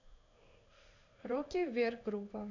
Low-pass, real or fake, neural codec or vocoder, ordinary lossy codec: 7.2 kHz; fake; codec, 16 kHz in and 24 kHz out, 1 kbps, XY-Tokenizer; none